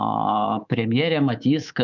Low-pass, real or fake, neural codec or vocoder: 7.2 kHz; fake; codec, 24 kHz, 3.1 kbps, DualCodec